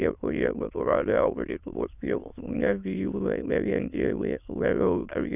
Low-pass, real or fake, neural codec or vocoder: 3.6 kHz; fake; autoencoder, 22.05 kHz, a latent of 192 numbers a frame, VITS, trained on many speakers